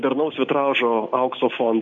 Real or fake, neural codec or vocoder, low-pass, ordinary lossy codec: real; none; 7.2 kHz; AAC, 64 kbps